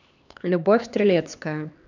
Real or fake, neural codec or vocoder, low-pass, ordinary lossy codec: fake; codec, 16 kHz, 2 kbps, X-Codec, HuBERT features, trained on LibriSpeech; 7.2 kHz; none